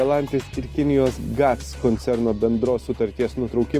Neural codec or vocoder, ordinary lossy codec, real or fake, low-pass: none; Opus, 32 kbps; real; 14.4 kHz